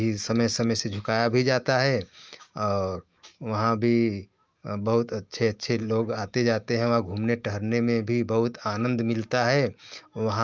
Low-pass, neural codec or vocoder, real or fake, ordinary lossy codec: 7.2 kHz; autoencoder, 48 kHz, 128 numbers a frame, DAC-VAE, trained on Japanese speech; fake; Opus, 24 kbps